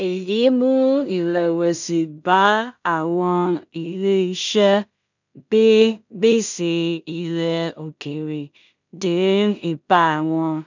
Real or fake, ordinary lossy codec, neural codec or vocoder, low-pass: fake; none; codec, 16 kHz in and 24 kHz out, 0.4 kbps, LongCat-Audio-Codec, two codebook decoder; 7.2 kHz